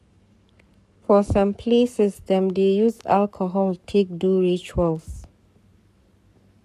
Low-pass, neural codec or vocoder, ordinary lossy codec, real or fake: 14.4 kHz; codec, 44.1 kHz, 7.8 kbps, Pupu-Codec; none; fake